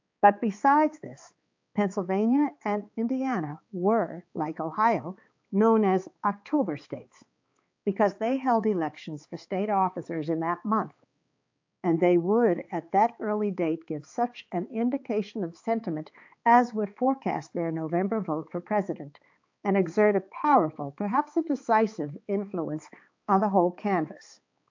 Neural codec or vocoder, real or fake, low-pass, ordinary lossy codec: codec, 16 kHz, 4 kbps, X-Codec, HuBERT features, trained on balanced general audio; fake; 7.2 kHz; AAC, 48 kbps